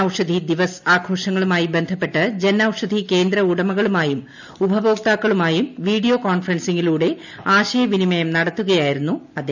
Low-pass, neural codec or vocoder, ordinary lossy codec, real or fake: 7.2 kHz; none; none; real